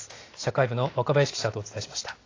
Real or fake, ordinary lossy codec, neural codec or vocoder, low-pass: real; AAC, 32 kbps; none; 7.2 kHz